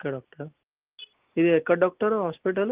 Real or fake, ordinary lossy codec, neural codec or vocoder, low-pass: real; Opus, 24 kbps; none; 3.6 kHz